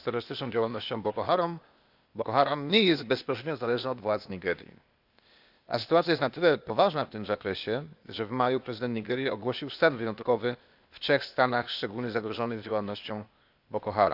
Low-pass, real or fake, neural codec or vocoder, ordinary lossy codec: 5.4 kHz; fake; codec, 16 kHz, 0.8 kbps, ZipCodec; Opus, 64 kbps